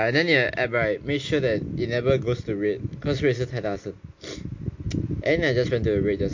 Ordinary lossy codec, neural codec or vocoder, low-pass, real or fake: MP3, 48 kbps; none; 7.2 kHz; real